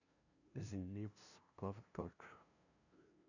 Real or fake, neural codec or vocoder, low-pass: fake; codec, 16 kHz, 0.5 kbps, FunCodec, trained on LibriTTS, 25 frames a second; 7.2 kHz